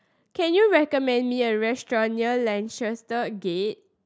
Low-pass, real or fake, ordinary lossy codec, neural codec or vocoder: none; real; none; none